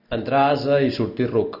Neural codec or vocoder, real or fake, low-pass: none; real; 5.4 kHz